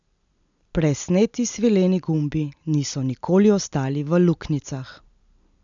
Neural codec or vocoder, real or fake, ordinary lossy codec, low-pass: none; real; none; 7.2 kHz